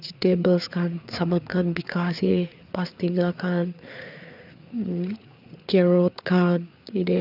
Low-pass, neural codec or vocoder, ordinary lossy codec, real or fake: 5.4 kHz; codec, 16 kHz, 4 kbps, FreqCodec, larger model; none; fake